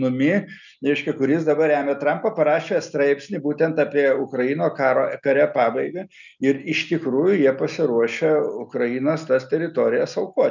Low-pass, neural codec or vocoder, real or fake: 7.2 kHz; none; real